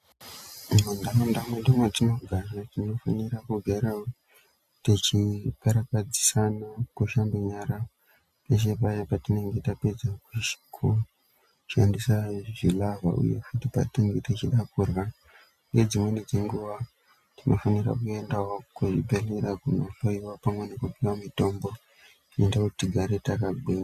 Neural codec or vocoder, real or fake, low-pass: none; real; 14.4 kHz